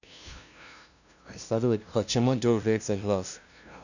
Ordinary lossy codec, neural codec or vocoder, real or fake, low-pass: none; codec, 16 kHz, 0.5 kbps, FunCodec, trained on LibriTTS, 25 frames a second; fake; 7.2 kHz